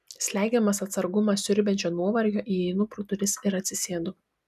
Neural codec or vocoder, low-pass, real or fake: none; 14.4 kHz; real